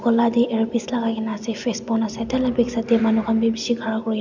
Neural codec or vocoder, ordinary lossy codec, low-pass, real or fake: none; none; 7.2 kHz; real